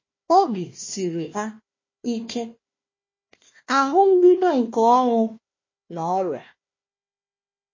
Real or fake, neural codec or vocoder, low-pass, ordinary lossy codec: fake; codec, 16 kHz, 1 kbps, FunCodec, trained on Chinese and English, 50 frames a second; 7.2 kHz; MP3, 32 kbps